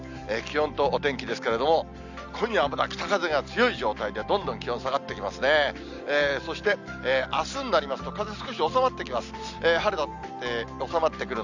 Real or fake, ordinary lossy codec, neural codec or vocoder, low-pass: real; Opus, 64 kbps; none; 7.2 kHz